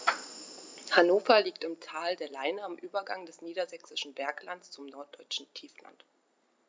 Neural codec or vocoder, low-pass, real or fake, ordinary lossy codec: none; none; real; none